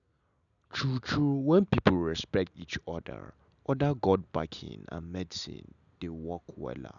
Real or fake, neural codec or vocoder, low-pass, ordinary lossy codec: real; none; 7.2 kHz; none